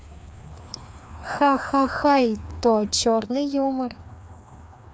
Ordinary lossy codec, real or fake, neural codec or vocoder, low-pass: none; fake; codec, 16 kHz, 2 kbps, FreqCodec, larger model; none